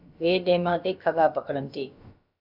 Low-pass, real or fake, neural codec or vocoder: 5.4 kHz; fake; codec, 16 kHz, about 1 kbps, DyCAST, with the encoder's durations